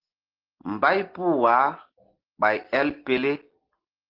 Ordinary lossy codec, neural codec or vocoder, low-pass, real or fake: Opus, 16 kbps; none; 5.4 kHz; real